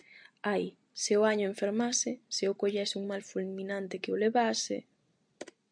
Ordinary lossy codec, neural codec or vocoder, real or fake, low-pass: MP3, 64 kbps; none; real; 9.9 kHz